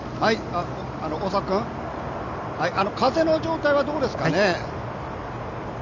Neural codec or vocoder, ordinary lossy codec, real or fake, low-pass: none; none; real; 7.2 kHz